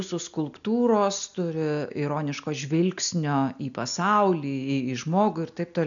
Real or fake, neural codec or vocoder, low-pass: real; none; 7.2 kHz